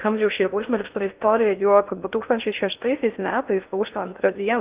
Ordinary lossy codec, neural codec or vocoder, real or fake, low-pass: Opus, 24 kbps; codec, 16 kHz in and 24 kHz out, 0.6 kbps, FocalCodec, streaming, 4096 codes; fake; 3.6 kHz